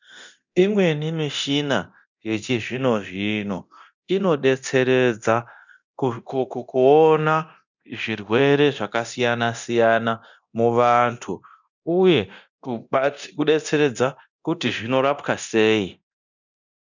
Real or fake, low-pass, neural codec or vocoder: fake; 7.2 kHz; codec, 24 kHz, 0.9 kbps, DualCodec